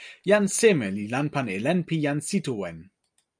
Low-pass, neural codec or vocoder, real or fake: 9.9 kHz; none; real